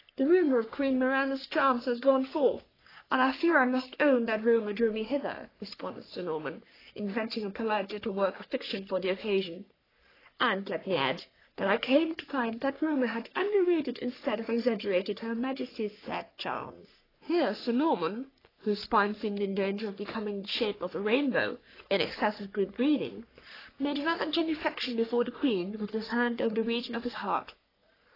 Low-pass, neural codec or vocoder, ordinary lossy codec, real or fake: 5.4 kHz; codec, 44.1 kHz, 3.4 kbps, Pupu-Codec; AAC, 24 kbps; fake